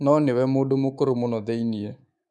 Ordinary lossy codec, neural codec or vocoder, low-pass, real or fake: none; codec, 24 kHz, 3.1 kbps, DualCodec; none; fake